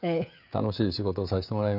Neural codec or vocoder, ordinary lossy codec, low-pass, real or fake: codec, 16 kHz, 16 kbps, FreqCodec, smaller model; none; 5.4 kHz; fake